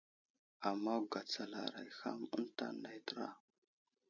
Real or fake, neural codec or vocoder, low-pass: real; none; 7.2 kHz